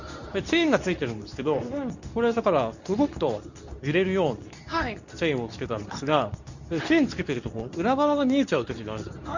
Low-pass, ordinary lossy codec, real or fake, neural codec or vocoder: 7.2 kHz; none; fake; codec, 24 kHz, 0.9 kbps, WavTokenizer, medium speech release version 2